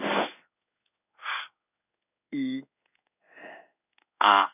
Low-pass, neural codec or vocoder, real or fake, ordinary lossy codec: 3.6 kHz; codec, 16 kHz in and 24 kHz out, 1 kbps, XY-Tokenizer; fake; none